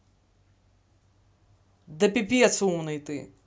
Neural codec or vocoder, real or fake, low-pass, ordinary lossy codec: none; real; none; none